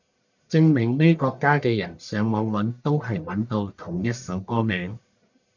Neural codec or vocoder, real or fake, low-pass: codec, 44.1 kHz, 1.7 kbps, Pupu-Codec; fake; 7.2 kHz